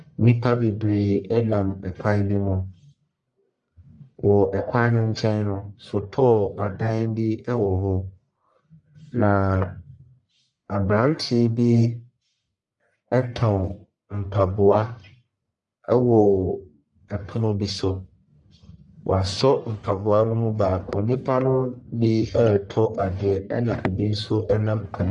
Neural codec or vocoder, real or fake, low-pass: codec, 44.1 kHz, 1.7 kbps, Pupu-Codec; fake; 10.8 kHz